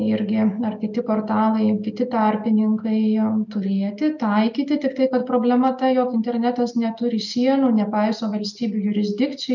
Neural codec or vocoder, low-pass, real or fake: none; 7.2 kHz; real